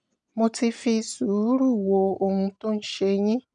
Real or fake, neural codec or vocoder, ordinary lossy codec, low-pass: real; none; none; 9.9 kHz